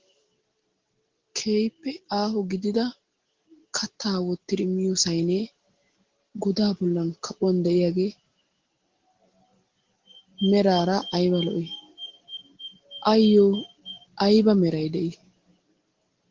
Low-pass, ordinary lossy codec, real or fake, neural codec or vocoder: 7.2 kHz; Opus, 16 kbps; real; none